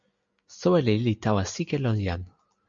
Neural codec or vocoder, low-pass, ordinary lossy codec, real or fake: none; 7.2 kHz; MP3, 64 kbps; real